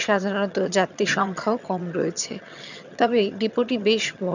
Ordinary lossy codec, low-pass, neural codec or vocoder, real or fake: none; 7.2 kHz; vocoder, 22.05 kHz, 80 mel bands, HiFi-GAN; fake